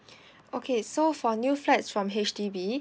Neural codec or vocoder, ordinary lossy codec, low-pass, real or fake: none; none; none; real